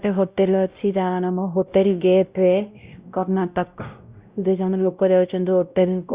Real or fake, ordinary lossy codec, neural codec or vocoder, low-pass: fake; Opus, 64 kbps; codec, 16 kHz, 0.5 kbps, X-Codec, WavLM features, trained on Multilingual LibriSpeech; 3.6 kHz